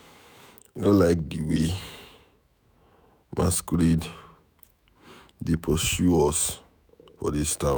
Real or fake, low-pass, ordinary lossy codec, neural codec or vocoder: fake; none; none; autoencoder, 48 kHz, 128 numbers a frame, DAC-VAE, trained on Japanese speech